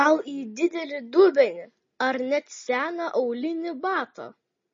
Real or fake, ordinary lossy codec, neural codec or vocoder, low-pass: real; MP3, 32 kbps; none; 7.2 kHz